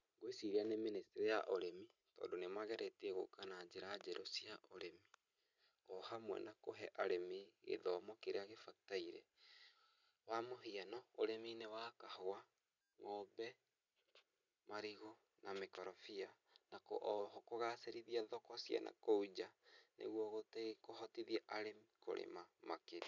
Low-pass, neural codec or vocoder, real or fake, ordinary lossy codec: 7.2 kHz; none; real; none